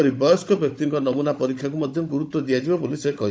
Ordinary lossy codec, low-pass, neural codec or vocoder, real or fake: none; none; codec, 16 kHz, 16 kbps, FunCodec, trained on Chinese and English, 50 frames a second; fake